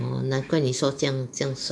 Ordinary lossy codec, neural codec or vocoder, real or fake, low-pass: none; autoencoder, 48 kHz, 128 numbers a frame, DAC-VAE, trained on Japanese speech; fake; 9.9 kHz